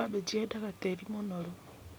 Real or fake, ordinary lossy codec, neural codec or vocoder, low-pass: real; none; none; none